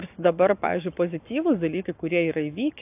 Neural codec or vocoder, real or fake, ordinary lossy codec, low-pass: codec, 44.1 kHz, 7.8 kbps, Pupu-Codec; fake; AAC, 32 kbps; 3.6 kHz